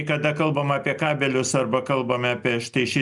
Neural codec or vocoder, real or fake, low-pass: none; real; 10.8 kHz